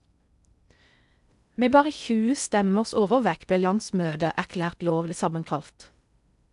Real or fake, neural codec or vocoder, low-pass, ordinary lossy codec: fake; codec, 16 kHz in and 24 kHz out, 0.6 kbps, FocalCodec, streaming, 2048 codes; 10.8 kHz; none